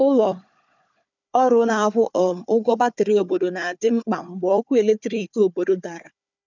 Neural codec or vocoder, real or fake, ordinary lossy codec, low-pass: codec, 16 kHz, 4 kbps, FunCodec, trained on Chinese and English, 50 frames a second; fake; none; 7.2 kHz